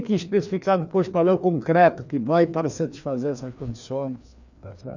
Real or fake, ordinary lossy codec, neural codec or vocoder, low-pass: fake; none; codec, 16 kHz, 2 kbps, FreqCodec, larger model; 7.2 kHz